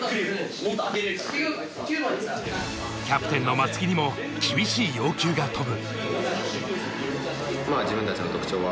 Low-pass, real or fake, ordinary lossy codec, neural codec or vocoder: none; real; none; none